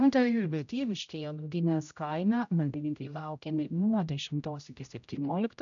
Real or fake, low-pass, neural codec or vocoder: fake; 7.2 kHz; codec, 16 kHz, 0.5 kbps, X-Codec, HuBERT features, trained on general audio